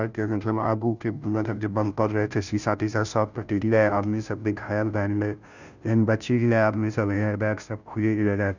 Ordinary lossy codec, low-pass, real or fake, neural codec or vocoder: none; 7.2 kHz; fake; codec, 16 kHz, 0.5 kbps, FunCodec, trained on Chinese and English, 25 frames a second